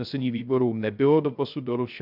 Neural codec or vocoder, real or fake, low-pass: codec, 16 kHz, 0.3 kbps, FocalCodec; fake; 5.4 kHz